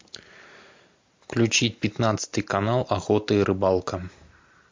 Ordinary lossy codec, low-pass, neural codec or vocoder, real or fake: MP3, 48 kbps; 7.2 kHz; none; real